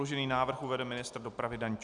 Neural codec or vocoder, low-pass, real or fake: none; 10.8 kHz; real